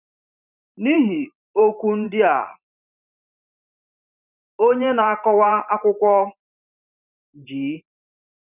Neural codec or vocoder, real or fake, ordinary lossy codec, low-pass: vocoder, 44.1 kHz, 128 mel bands every 512 samples, BigVGAN v2; fake; none; 3.6 kHz